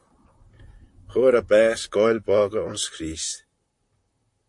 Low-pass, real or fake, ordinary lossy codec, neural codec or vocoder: 10.8 kHz; fake; MP3, 48 kbps; vocoder, 44.1 kHz, 128 mel bands, Pupu-Vocoder